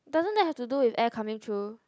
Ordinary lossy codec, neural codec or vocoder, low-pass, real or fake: none; none; none; real